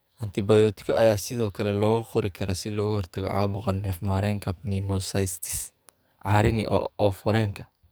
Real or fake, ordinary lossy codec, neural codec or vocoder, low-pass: fake; none; codec, 44.1 kHz, 2.6 kbps, SNAC; none